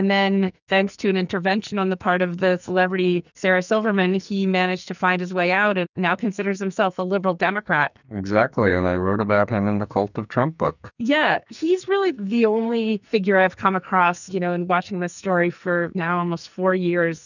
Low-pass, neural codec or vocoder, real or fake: 7.2 kHz; codec, 44.1 kHz, 2.6 kbps, SNAC; fake